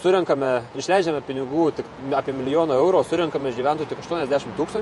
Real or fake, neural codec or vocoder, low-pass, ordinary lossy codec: fake; vocoder, 48 kHz, 128 mel bands, Vocos; 14.4 kHz; MP3, 48 kbps